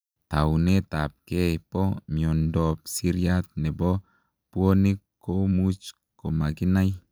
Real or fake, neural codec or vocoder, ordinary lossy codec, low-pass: real; none; none; none